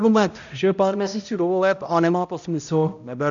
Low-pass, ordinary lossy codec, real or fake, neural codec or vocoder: 7.2 kHz; AAC, 64 kbps; fake; codec, 16 kHz, 0.5 kbps, X-Codec, HuBERT features, trained on balanced general audio